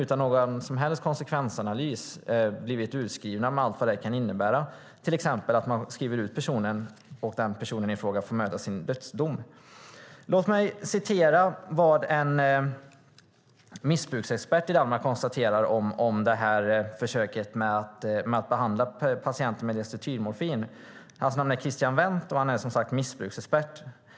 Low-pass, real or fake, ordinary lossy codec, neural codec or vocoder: none; real; none; none